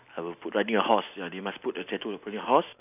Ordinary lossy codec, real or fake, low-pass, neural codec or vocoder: none; real; 3.6 kHz; none